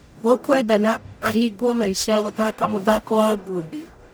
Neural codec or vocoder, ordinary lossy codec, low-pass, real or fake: codec, 44.1 kHz, 0.9 kbps, DAC; none; none; fake